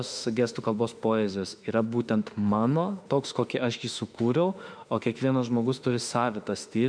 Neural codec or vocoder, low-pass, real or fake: autoencoder, 48 kHz, 32 numbers a frame, DAC-VAE, trained on Japanese speech; 9.9 kHz; fake